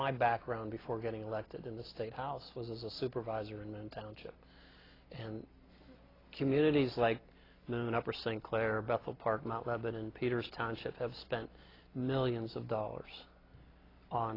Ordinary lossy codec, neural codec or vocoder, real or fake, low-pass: AAC, 24 kbps; none; real; 5.4 kHz